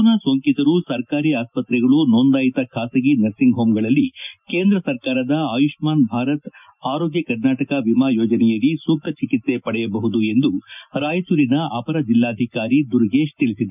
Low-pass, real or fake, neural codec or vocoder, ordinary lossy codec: 3.6 kHz; real; none; none